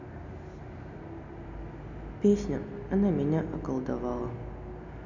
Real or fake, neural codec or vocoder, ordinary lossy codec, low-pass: real; none; none; 7.2 kHz